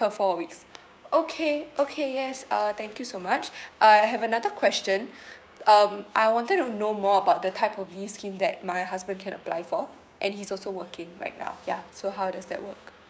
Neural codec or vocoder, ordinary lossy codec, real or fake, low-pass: codec, 16 kHz, 6 kbps, DAC; none; fake; none